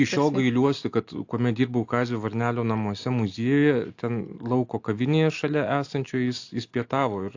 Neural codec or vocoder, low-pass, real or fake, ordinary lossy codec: none; 7.2 kHz; real; AAC, 48 kbps